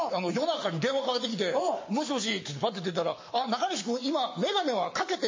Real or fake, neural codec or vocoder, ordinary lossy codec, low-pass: fake; codec, 44.1 kHz, 7.8 kbps, Pupu-Codec; MP3, 32 kbps; 7.2 kHz